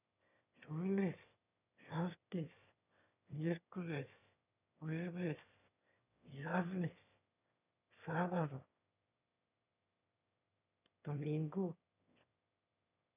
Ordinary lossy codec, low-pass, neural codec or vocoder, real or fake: none; 3.6 kHz; autoencoder, 22.05 kHz, a latent of 192 numbers a frame, VITS, trained on one speaker; fake